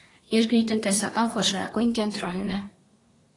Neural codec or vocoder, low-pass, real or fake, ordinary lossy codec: codec, 24 kHz, 1 kbps, SNAC; 10.8 kHz; fake; AAC, 32 kbps